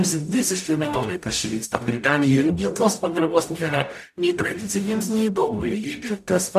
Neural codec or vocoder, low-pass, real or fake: codec, 44.1 kHz, 0.9 kbps, DAC; 14.4 kHz; fake